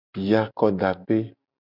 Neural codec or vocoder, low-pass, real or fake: none; 5.4 kHz; real